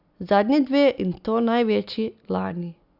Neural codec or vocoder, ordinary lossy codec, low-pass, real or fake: none; Opus, 64 kbps; 5.4 kHz; real